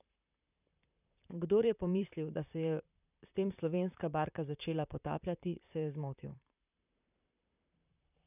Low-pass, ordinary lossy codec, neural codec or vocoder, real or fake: 3.6 kHz; none; vocoder, 44.1 kHz, 128 mel bands, Pupu-Vocoder; fake